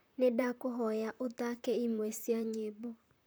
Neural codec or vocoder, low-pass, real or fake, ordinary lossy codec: vocoder, 44.1 kHz, 128 mel bands, Pupu-Vocoder; none; fake; none